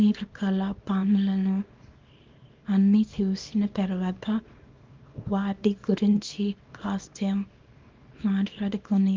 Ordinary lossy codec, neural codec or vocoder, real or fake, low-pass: Opus, 24 kbps; codec, 24 kHz, 0.9 kbps, WavTokenizer, small release; fake; 7.2 kHz